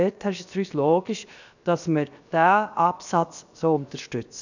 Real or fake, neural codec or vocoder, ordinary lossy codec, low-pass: fake; codec, 16 kHz, 0.7 kbps, FocalCodec; none; 7.2 kHz